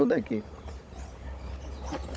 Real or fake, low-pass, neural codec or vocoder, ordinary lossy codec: fake; none; codec, 16 kHz, 16 kbps, FunCodec, trained on Chinese and English, 50 frames a second; none